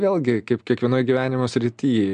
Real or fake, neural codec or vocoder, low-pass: real; none; 9.9 kHz